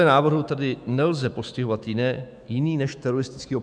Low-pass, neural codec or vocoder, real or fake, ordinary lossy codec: 9.9 kHz; autoencoder, 48 kHz, 128 numbers a frame, DAC-VAE, trained on Japanese speech; fake; MP3, 96 kbps